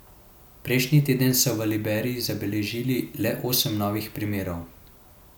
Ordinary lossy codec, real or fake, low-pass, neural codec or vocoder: none; real; none; none